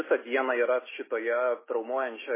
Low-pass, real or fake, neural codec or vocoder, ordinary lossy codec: 3.6 kHz; real; none; MP3, 16 kbps